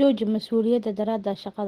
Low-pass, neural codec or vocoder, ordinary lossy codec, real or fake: 14.4 kHz; none; Opus, 16 kbps; real